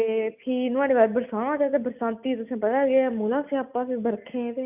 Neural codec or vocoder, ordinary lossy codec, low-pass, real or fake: none; none; 3.6 kHz; real